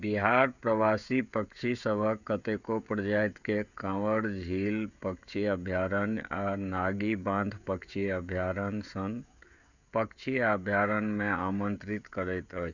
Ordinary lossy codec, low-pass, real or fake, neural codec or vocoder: none; 7.2 kHz; fake; codec, 16 kHz, 16 kbps, FreqCodec, smaller model